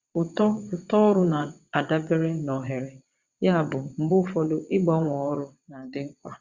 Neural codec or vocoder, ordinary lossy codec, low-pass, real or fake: vocoder, 22.05 kHz, 80 mel bands, WaveNeXt; Opus, 64 kbps; 7.2 kHz; fake